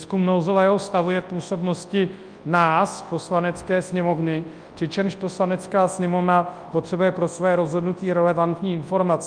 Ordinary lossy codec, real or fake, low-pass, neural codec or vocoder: Opus, 32 kbps; fake; 9.9 kHz; codec, 24 kHz, 0.9 kbps, WavTokenizer, large speech release